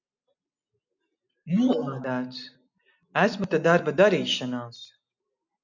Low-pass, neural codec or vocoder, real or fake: 7.2 kHz; none; real